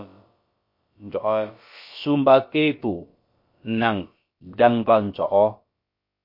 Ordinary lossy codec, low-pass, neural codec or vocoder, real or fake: MP3, 32 kbps; 5.4 kHz; codec, 16 kHz, about 1 kbps, DyCAST, with the encoder's durations; fake